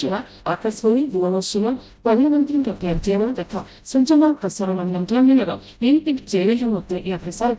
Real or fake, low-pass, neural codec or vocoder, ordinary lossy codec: fake; none; codec, 16 kHz, 0.5 kbps, FreqCodec, smaller model; none